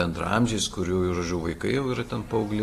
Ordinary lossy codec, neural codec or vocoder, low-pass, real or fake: AAC, 48 kbps; none; 14.4 kHz; real